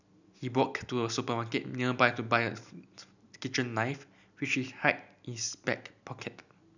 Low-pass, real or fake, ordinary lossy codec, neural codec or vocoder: 7.2 kHz; real; none; none